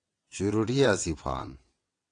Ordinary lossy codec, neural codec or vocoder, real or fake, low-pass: AAC, 48 kbps; vocoder, 22.05 kHz, 80 mel bands, WaveNeXt; fake; 9.9 kHz